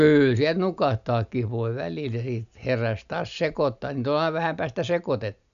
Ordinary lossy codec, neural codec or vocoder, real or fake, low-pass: none; none; real; 7.2 kHz